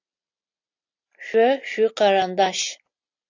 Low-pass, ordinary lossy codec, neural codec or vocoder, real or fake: 7.2 kHz; AAC, 48 kbps; none; real